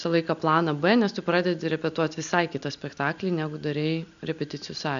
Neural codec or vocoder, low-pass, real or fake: none; 7.2 kHz; real